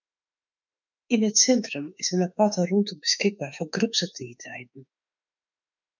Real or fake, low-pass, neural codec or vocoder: fake; 7.2 kHz; autoencoder, 48 kHz, 32 numbers a frame, DAC-VAE, trained on Japanese speech